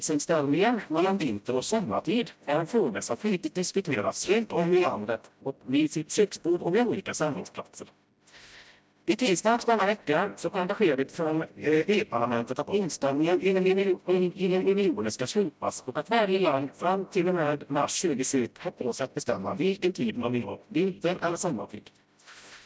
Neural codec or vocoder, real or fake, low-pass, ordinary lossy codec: codec, 16 kHz, 0.5 kbps, FreqCodec, smaller model; fake; none; none